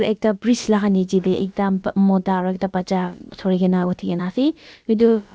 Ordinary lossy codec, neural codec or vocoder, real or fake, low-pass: none; codec, 16 kHz, about 1 kbps, DyCAST, with the encoder's durations; fake; none